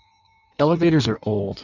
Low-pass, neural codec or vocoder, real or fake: 7.2 kHz; codec, 16 kHz in and 24 kHz out, 1.1 kbps, FireRedTTS-2 codec; fake